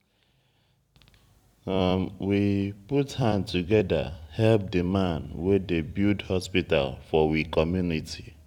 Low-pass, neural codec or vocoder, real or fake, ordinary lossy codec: 19.8 kHz; vocoder, 44.1 kHz, 128 mel bands every 256 samples, BigVGAN v2; fake; none